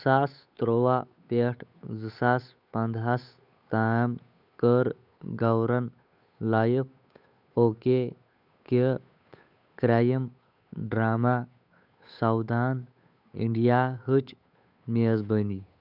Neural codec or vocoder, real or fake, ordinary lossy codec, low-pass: codec, 16 kHz, 8 kbps, FunCodec, trained on Chinese and English, 25 frames a second; fake; none; 5.4 kHz